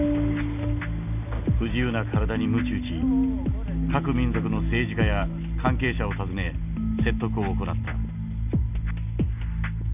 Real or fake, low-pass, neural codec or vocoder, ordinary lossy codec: real; 3.6 kHz; none; none